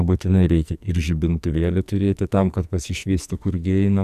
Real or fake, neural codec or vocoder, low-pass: fake; codec, 44.1 kHz, 2.6 kbps, SNAC; 14.4 kHz